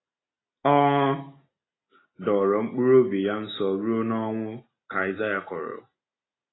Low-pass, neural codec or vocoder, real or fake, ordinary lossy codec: 7.2 kHz; none; real; AAC, 16 kbps